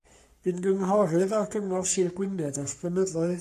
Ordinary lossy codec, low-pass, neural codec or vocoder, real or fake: MP3, 64 kbps; 14.4 kHz; codec, 44.1 kHz, 3.4 kbps, Pupu-Codec; fake